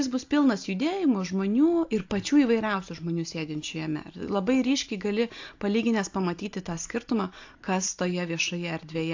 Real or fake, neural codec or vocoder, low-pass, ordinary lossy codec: real; none; 7.2 kHz; AAC, 48 kbps